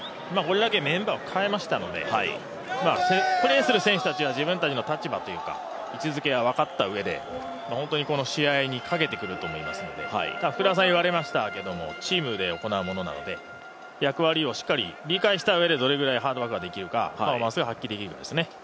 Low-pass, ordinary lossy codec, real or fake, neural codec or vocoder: none; none; real; none